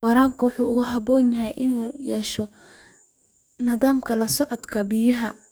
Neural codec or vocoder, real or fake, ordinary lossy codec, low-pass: codec, 44.1 kHz, 2.6 kbps, DAC; fake; none; none